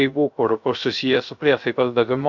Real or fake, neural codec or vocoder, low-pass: fake; codec, 16 kHz, 0.3 kbps, FocalCodec; 7.2 kHz